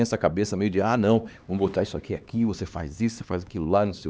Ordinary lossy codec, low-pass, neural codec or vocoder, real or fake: none; none; codec, 16 kHz, 2 kbps, X-Codec, HuBERT features, trained on LibriSpeech; fake